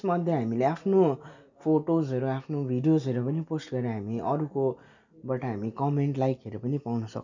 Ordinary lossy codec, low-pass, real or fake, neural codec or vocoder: none; 7.2 kHz; real; none